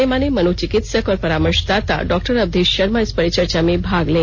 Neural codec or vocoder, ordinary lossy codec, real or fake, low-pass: none; none; real; none